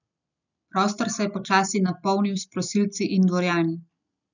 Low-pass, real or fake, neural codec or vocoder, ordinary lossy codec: 7.2 kHz; real; none; none